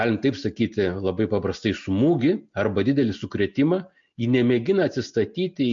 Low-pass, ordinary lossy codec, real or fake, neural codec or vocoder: 7.2 kHz; MP3, 48 kbps; real; none